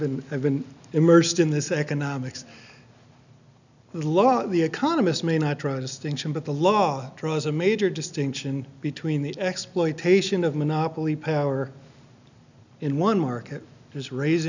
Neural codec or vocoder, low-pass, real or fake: none; 7.2 kHz; real